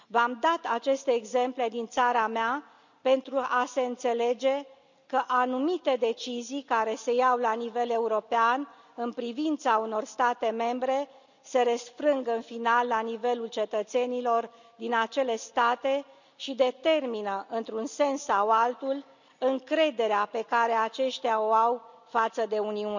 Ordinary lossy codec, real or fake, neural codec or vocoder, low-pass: none; real; none; 7.2 kHz